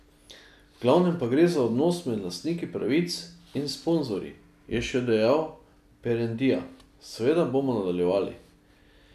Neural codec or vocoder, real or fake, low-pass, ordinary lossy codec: none; real; 14.4 kHz; none